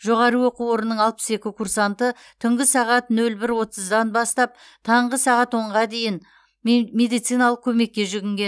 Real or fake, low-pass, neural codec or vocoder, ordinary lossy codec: real; none; none; none